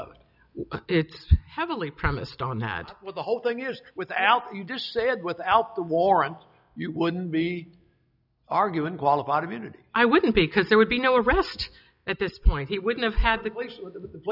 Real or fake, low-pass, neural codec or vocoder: real; 5.4 kHz; none